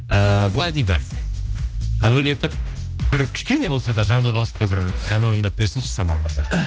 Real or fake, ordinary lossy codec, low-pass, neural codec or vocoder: fake; none; none; codec, 16 kHz, 1 kbps, X-Codec, HuBERT features, trained on general audio